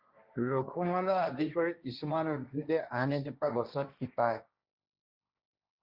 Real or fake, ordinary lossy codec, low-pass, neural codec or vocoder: fake; Opus, 64 kbps; 5.4 kHz; codec, 16 kHz, 1.1 kbps, Voila-Tokenizer